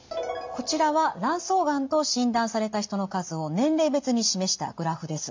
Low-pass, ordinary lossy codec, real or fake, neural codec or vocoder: 7.2 kHz; MP3, 64 kbps; real; none